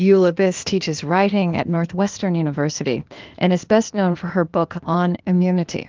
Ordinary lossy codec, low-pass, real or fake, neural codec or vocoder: Opus, 32 kbps; 7.2 kHz; fake; codec, 16 kHz, 0.8 kbps, ZipCodec